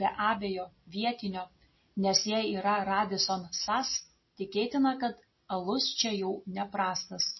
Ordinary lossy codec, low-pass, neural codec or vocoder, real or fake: MP3, 24 kbps; 7.2 kHz; none; real